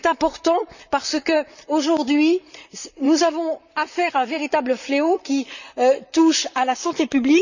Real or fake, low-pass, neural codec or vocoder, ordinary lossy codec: fake; 7.2 kHz; vocoder, 44.1 kHz, 128 mel bands, Pupu-Vocoder; none